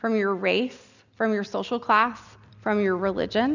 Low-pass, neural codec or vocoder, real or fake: 7.2 kHz; none; real